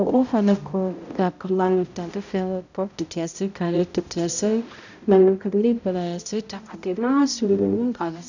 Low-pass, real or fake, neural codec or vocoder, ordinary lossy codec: 7.2 kHz; fake; codec, 16 kHz, 0.5 kbps, X-Codec, HuBERT features, trained on balanced general audio; none